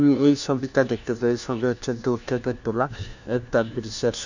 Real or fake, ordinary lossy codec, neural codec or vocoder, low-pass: fake; none; codec, 16 kHz, 1 kbps, FunCodec, trained on LibriTTS, 50 frames a second; 7.2 kHz